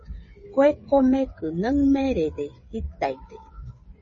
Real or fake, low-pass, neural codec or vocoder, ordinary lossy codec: fake; 7.2 kHz; codec, 16 kHz, 8 kbps, FreqCodec, smaller model; MP3, 32 kbps